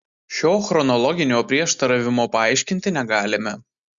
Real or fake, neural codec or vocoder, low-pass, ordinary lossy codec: real; none; 7.2 kHz; Opus, 64 kbps